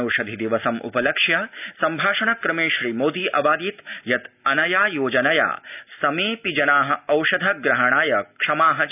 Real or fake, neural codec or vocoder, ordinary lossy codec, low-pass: real; none; none; 3.6 kHz